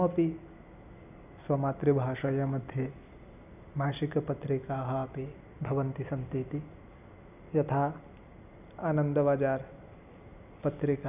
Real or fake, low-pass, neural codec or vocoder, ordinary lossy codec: real; 3.6 kHz; none; none